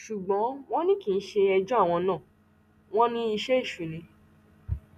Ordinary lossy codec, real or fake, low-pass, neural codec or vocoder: none; real; 14.4 kHz; none